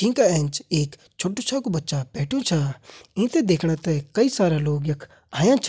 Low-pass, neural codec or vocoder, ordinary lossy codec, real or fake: none; none; none; real